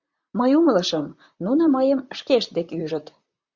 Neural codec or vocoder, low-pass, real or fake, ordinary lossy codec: vocoder, 44.1 kHz, 128 mel bands, Pupu-Vocoder; 7.2 kHz; fake; Opus, 64 kbps